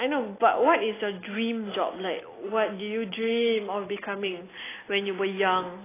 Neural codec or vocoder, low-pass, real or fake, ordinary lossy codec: none; 3.6 kHz; real; AAC, 16 kbps